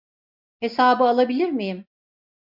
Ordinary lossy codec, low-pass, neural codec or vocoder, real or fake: MP3, 48 kbps; 5.4 kHz; none; real